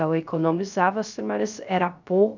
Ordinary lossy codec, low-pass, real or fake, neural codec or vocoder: none; 7.2 kHz; fake; codec, 16 kHz, 0.3 kbps, FocalCodec